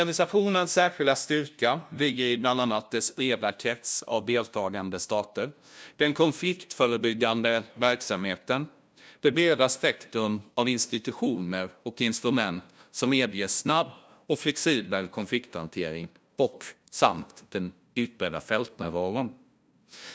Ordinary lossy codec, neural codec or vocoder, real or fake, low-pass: none; codec, 16 kHz, 0.5 kbps, FunCodec, trained on LibriTTS, 25 frames a second; fake; none